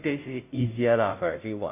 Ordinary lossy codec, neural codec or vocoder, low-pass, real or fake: none; codec, 16 kHz, 0.5 kbps, FunCodec, trained on Chinese and English, 25 frames a second; 3.6 kHz; fake